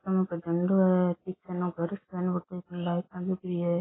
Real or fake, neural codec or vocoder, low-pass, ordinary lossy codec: real; none; 7.2 kHz; AAC, 16 kbps